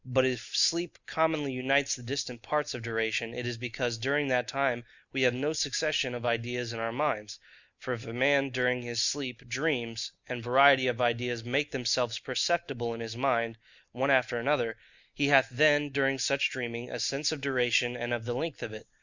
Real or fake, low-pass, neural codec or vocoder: real; 7.2 kHz; none